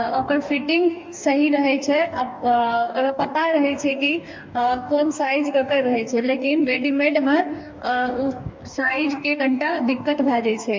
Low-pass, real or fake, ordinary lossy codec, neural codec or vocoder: 7.2 kHz; fake; MP3, 48 kbps; codec, 44.1 kHz, 2.6 kbps, DAC